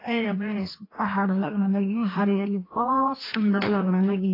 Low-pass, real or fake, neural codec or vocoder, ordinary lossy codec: 5.4 kHz; fake; codec, 16 kHz, 1 kbps, FreqCodec, larger model; AAC, 24 kbps